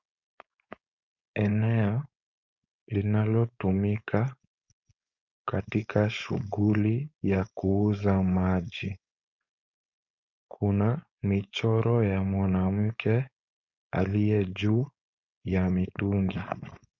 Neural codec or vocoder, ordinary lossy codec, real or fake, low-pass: codec, 16 kHz, 4.8 kbps, FACodec; Opus, 64 kbps; fake; 7.2 kHz